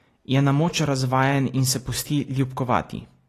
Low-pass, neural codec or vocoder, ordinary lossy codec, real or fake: 14.4 kHz; none; AAC, 48 kbps; real